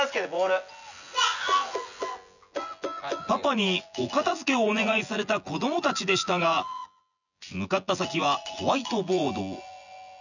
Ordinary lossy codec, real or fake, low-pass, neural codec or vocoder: none; fake; 7.2 kHz; vocoder, 24 kHz, 100 mel bands, Vocos